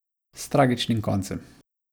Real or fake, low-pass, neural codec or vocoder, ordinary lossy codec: real; none; none; none